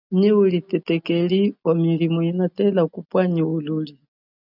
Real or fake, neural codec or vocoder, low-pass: real; none; 5.4 kHz